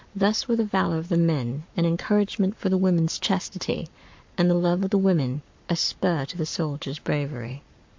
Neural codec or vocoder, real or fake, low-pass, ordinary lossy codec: codec, 44.1 kHz, 7.8 kbps, Pupu-Codec; fake; 7.2 kHz; MP3, 48 kbps